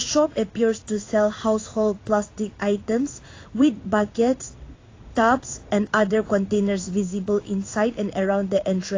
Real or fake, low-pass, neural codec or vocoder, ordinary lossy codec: fake; 7.2 kHz; codec, 16 kHz in and 24 kHz out, 1 kbps, XY-Tokenizer; AAC, 32 kbps